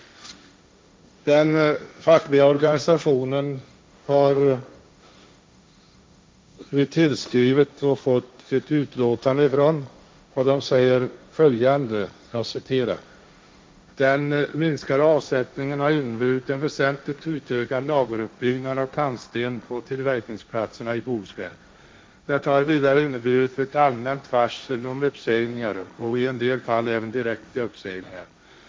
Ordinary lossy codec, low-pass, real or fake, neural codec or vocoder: none; none; fake; codec, 16 kHz, 1.1 kbps, Voila-Tokenizer